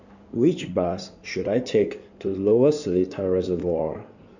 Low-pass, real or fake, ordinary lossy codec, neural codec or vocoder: 7.2 kHz; fake; none; codec, 16 kHz in and 24 kHz out, 2.2 kbps, FireRedTTS-2 codec